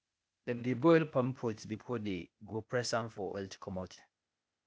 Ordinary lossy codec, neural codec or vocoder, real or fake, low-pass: none; codec, 16 kHz, 0.8 kbps, ZipCodec; fake; none